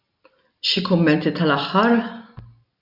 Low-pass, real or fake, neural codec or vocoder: 5.4 kHz; real; none